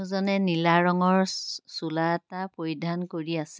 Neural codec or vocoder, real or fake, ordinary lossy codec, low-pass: none; real; none; none